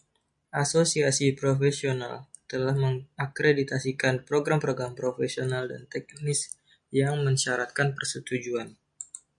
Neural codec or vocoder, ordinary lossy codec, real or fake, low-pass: none; MP3, 96 kbps; real; 9.9 kHz